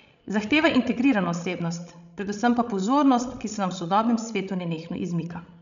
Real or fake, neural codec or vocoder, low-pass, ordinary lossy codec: fake; codec, 16 kHz, 16 kbps, FreqCodec, larger model; 7.2 kHz; none